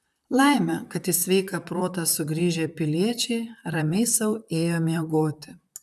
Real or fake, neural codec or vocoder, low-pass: fake; vocoder, 44.1 kHz, 128 mel bands, Pupu-Vocoder; 14.4 kHz